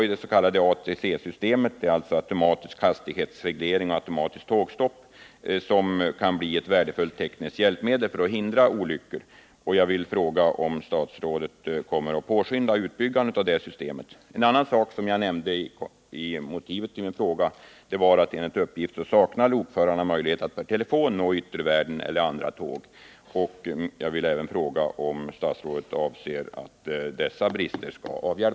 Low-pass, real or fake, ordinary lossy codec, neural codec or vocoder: none; real; none; none